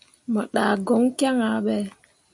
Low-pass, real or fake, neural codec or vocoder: 10.8 kHz; real; none